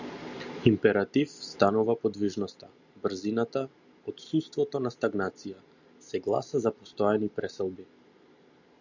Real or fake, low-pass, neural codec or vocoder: real; 7.2 kHz; none